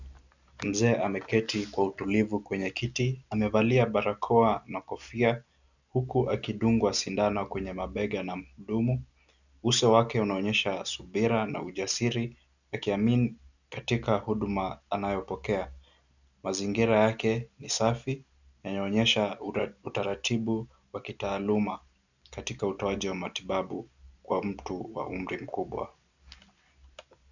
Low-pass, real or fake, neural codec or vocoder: 7.2 kHz; real; none